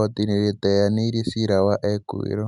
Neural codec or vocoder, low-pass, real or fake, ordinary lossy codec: none; 10.8 kHz; real; none